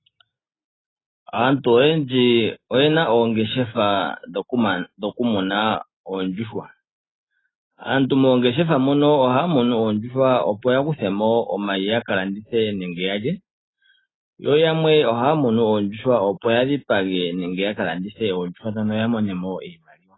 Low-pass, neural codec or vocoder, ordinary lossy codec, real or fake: 7.2 kHz; none; AAC, 16 kbps; real